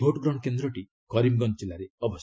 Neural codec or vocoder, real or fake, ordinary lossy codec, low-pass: none; real; none; none